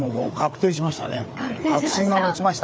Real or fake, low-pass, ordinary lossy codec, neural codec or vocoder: fake; none; none; codec, 16 kHz, 4 kbps, FreqCodec, larger model